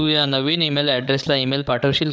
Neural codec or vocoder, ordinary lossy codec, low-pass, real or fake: codec, 16 kHz, 8 kbps, FreqCodec, larger model; none; none; fake